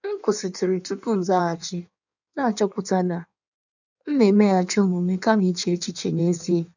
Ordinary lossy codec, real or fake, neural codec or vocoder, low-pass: none; fake; codec, 16 kHz in and 24 kHz out, 1.1 kbps, FireRedTTS-2 codec; 7.2 kHz